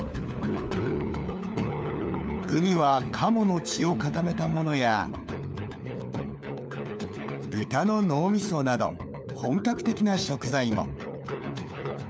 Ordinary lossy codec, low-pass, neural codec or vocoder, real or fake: none; none; codec, 16 kHz, 4 kbps, FunCodec, trained on LibriTTS, 50 frames a second; fake